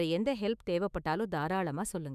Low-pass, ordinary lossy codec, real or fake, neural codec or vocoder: 14.4 kHz; none; fake; autoencoder, 48 kHz, 128 numbers a frame, DAC-VAE, trained on Japanese speech